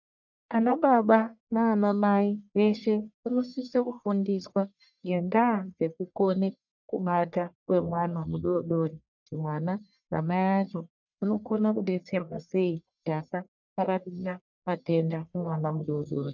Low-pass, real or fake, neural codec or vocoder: 7.2 kHz; fake; codec, 44.1 kHz, 1.7 kbps, Pupu-Codec